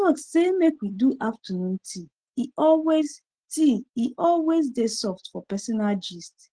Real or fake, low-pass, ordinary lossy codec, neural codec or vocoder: real; 9.9 kHz; Opus, 16 kbps; none